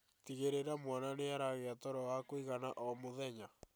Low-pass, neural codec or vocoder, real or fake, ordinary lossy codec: none; none; real; none